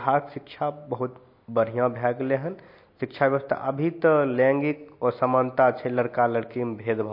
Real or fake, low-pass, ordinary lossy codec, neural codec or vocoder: real; 5.4 kHz; MP3, 32 kbps; none